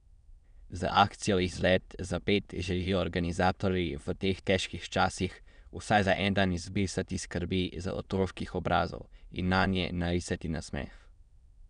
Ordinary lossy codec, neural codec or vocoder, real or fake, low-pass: none; autoencoder, 22.05 kHz, a latent of 192 numbers a frame, VITS, trained on many speakers; fake; 9.9 kHz